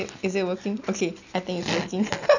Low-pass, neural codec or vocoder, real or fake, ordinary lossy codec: 7.2 kHz; none; real; none